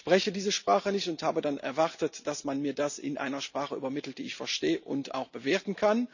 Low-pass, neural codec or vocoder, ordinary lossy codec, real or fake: 7.2 kHz; none; none; real